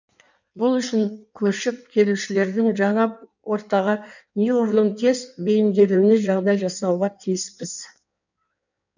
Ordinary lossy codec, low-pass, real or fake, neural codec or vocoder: none; 7.2 kHz; fake; codec, 16 kHz in and 24 kHz out, 1.1 kbps, FireRedTTS-2 codec